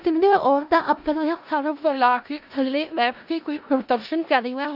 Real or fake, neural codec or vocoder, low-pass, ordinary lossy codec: fake; codec, 16 kHz in and 24 kHz out, 0.4 kbps, LongCat-Audio-Codec, four codebook decoder; 5.4 kHz; AAC, 48 kbps